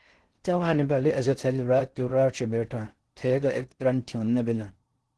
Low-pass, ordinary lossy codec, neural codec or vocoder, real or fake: 10.8 kHz; Opus, 16 kbps; codec, 16 kHz in and 24 kHz out, 0.6 kbps, FocalCodec, streaming, 2048 codes; fake